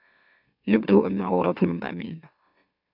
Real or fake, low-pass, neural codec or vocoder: fake; 5.4 kHz; autoencoder, 44.1 kHz, a latent of 192 numbers a frame, MeloTTS